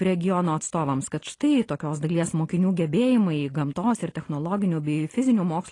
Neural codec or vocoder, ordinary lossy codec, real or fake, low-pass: none; AAC, 32 kbps; real; 10.8 kHz